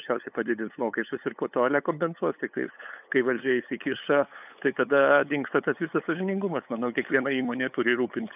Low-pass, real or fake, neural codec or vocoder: 3.6 kHz; fake; codec, 16 kHz, 8 kbps, FunCodec, trained on LibriTTS, 25 frames a second